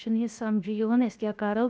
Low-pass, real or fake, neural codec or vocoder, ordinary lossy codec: none; fake; codec, 16 kHz, 0.7 kbps, FocalCodec; none